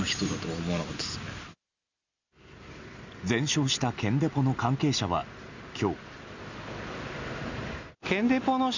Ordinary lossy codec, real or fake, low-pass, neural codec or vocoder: none; real; 7.2 kHz; none